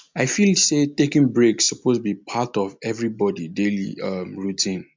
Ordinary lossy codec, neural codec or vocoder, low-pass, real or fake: MP3, 64 kbps; none; 7.2 kHz; real